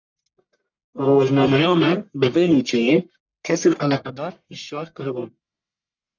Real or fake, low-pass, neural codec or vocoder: fake; 7.2 kHz; codec, 44.1 kHz, 1.7 kbps, Pupu-Codec